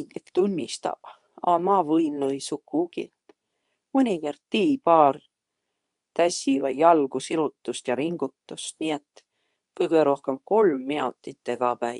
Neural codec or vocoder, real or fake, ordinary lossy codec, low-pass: codec, 24 kHz, 0.9 kbps, WavTokenizer, medium speech release version 2; fake; none; 10.8 kHz